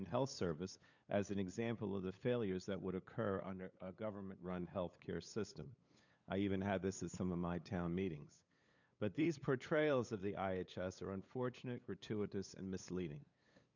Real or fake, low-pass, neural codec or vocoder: fake; 7.2 kHz; codec, 16 kHz, 8 kbps, FunCodec, trained on LibriTTS, 25 frames a second